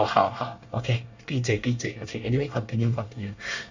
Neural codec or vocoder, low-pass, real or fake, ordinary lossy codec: codec, 24 kHz, 1 kbps, SNAC; 7.2 kHz; fake; Opus, 64 kbps